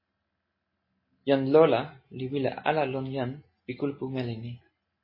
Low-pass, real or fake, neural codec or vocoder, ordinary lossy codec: 5.4 kHz; real; none; MP3, 24 kbps